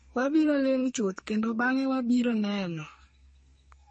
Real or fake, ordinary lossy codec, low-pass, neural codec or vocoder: fake; MP3, 32 kbps; 10.8 kHz; codec, 44.1 kHz, 2.6 kbps, SNAC